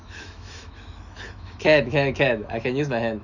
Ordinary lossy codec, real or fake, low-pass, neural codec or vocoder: none; fake; 7.2 kHz; autoencoder, 48 kHz, 128 numbers a frame, DAC-VAE, trained on Japanese speech